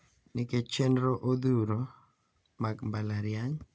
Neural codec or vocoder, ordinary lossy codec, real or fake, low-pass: none; none; real; none